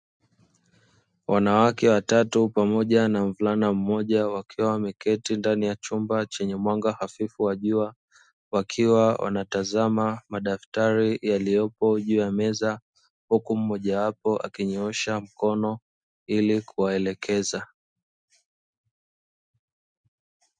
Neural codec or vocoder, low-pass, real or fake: none; 9.9 kHz; real